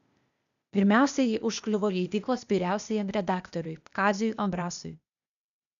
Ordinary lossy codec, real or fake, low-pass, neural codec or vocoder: MP3, 96 kbps; fake; 7.2 kHz; codec, 16 kHz, 0.8 kbps, ZipCodec